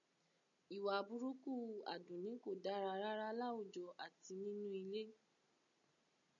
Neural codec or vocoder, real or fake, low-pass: none; real; 7.2 kHz